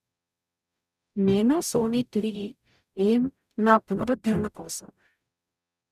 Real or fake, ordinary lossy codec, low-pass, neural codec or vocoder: fake; none; 14.4 kHz; codec, 44.1 kHz, 0.9 kbps, DAC